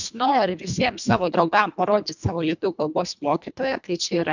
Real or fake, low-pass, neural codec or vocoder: fake; 7.2 kHz; codec, 24 kHz, 1.5 kbps, HILCodec